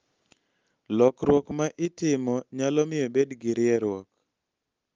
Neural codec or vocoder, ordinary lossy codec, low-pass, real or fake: none; Opus, 32 kbps; 7.2 kHz; real